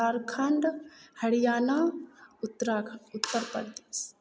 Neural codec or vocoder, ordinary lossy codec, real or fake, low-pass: none; none; real; none